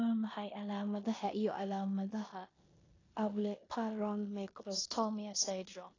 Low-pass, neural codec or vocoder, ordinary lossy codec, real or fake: 7.2 kHz; codec, 16 kHz in and 24 kHz out, 0.9 kbps, LongCat-Audio-Codec, four codebook decoder; AAC, 32 kbps; fake